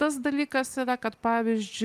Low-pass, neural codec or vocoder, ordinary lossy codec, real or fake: 14.4 kHz; none; Opus, 32 kbps; real